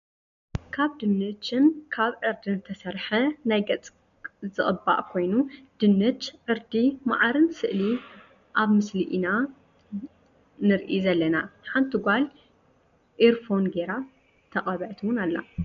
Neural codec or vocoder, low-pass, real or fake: none; 7.2 kHz; real